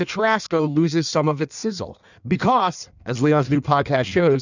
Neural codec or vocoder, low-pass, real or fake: codec, 16 kHz in and 24 kHz out, 1.1 kbps, FireRedTTS-2 codec; 7.2 kHz; fake